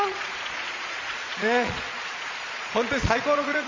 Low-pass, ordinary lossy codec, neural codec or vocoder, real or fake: 7.2 kHz; Opus, 32 kbps; vocoder, 22.05 kHz, 80 mel bands, Vocos; fake